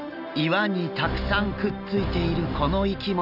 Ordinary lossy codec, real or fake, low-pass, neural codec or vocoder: none; real; 5.4 kHz; none